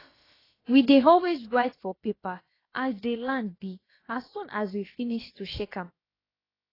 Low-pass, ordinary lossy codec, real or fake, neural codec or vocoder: 5.4 kHz; AAC, 24 kbps; fake; codec, 16 kHz, about 1 kbps, DyCAST, with the encoder's durations